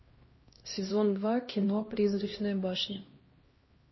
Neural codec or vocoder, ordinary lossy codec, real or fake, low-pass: codec, 16 kHz, 1 kbps, X-Codec, HuBERT features, trained on LibriSpeech; MP3, 24 kbps; fake; 7.2 kHz